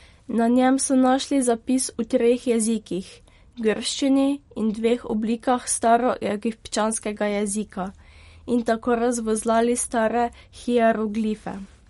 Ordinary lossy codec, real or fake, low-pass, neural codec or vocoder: MP3, 48 kbps; real; 19.8 kHz; none